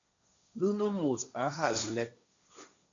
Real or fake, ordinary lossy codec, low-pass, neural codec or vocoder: fake; MP3, 64 kbps; 7.2 kHz; codec, 16 kHz, 1.1 kbps, Voila-Tokenizer